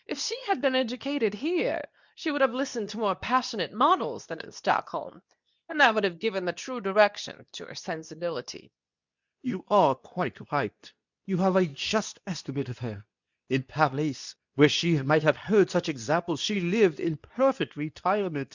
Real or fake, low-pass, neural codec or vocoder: fake; 7.2 kHz; codec, 24 kHz, 0.9 kbps, WavTokenizer, medium speech release version 2